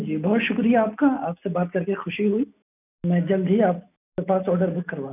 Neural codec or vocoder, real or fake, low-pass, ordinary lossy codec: none; real; 3.6 kHz; none